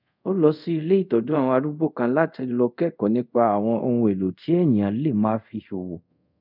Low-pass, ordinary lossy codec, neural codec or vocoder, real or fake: 5.4 kHz; none; codec, 24 kHz, 0.5 kbps, DualCodec; fake